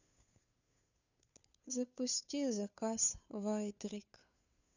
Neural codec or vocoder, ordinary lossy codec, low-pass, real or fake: codec, 16 kHz, 2 kbps, FunCodec, trained on Chinese and English, 25 frames a second; none; 7.2 kHz; fake